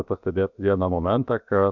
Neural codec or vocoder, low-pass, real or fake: codec, 16 kHz, 0.7 kbps, FocalCodec; 7.2 kHz; fake